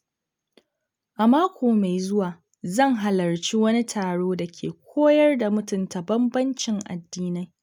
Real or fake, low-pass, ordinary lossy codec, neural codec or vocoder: real; 19.8 kHz; none; none